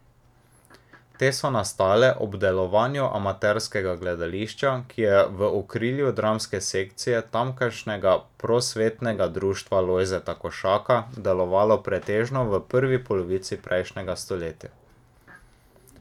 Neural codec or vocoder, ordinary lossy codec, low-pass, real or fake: none; none; 19.8 kHz; real